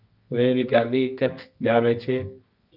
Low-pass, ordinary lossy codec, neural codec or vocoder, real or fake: 5.4 kHz; Opus, 24 kbps; codec, 24 kHz, 0.9 kbps, WavTokenizer, medium music audio release; fake